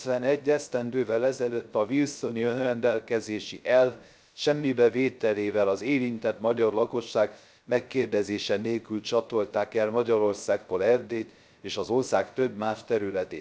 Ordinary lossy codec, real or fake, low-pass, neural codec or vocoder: none; fake; none; codec, 16 kHz, 0.3 kbps, FocalCodec